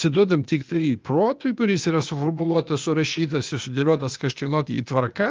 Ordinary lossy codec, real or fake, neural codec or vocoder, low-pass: Opus, 32 kbps; fake; codec, 16 kHz, 0.8 kbps, ZipCodec; 7.2 kHz